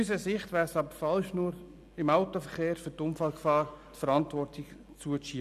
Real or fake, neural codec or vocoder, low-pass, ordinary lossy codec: real; none; 14.4 kHz; none